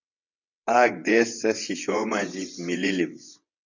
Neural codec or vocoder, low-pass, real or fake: vocoder, 22.05 kHz, 80 mel bands, WaveNeXt; 7.2 kHz; fake